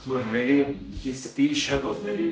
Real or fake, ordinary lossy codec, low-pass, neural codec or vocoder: fake; none; none; codec, 16 kHz, 0.5 kbps, X-Codec, HuBERT features, trained on general audio